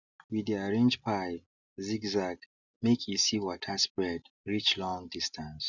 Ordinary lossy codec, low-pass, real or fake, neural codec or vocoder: none; 7.2 kHz; real; none